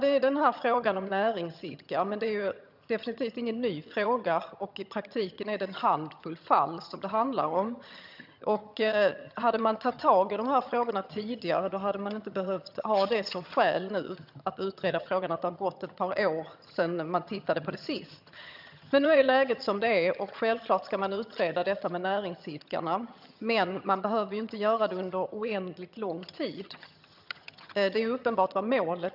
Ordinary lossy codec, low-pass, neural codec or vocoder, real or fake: none; 5.4 kHz; vocoder, 22.05 kHz, 80 mel bands, HiFi-GAN; fake